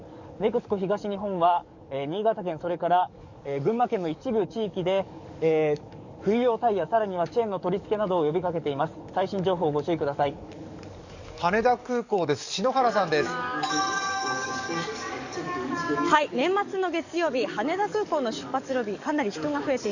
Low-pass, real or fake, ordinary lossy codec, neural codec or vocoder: 7.2 kHz; fake; none; codec, 44.1 kHz, 7.8 kbps, DAC